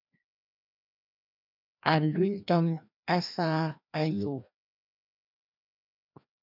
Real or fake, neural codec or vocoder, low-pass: fake; codec, 16 kHz, 1 kbps, FreqCodec, larger model; 5.4 kHz